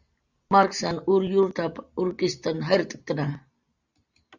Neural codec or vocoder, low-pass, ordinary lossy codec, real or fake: none; 7.2 kHz; Opus, 64 kbps; real